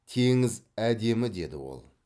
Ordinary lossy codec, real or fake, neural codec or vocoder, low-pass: none; real; none; none